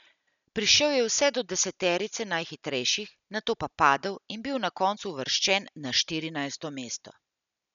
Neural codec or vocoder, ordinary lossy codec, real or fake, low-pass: none; none; real; 7.2 kHz